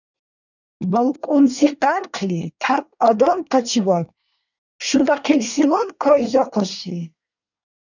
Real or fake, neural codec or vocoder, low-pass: fake; codec, 24 kHz, 1 kbps, SNAC; 7.2 kHz